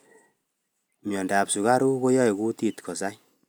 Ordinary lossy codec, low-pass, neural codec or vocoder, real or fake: none; none; none; real